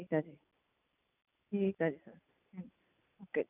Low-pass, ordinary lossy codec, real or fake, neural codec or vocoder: 3.6 kHz; none; real; none